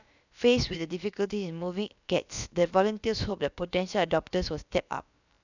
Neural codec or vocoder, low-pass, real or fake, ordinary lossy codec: codec, 16 kHz, about 1 kbps, DyCAST, with the encoder's durations; 7.2 kHz; fake; none